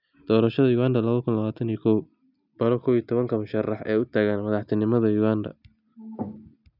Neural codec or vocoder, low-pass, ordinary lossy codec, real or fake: none; 5.4 kHz; none; real